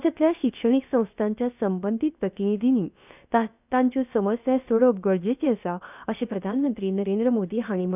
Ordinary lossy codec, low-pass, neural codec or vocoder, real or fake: none; 3.6 kHz; codec, 16 kHz, about 1 kbps, DyCAST, with the encoder's durations; fake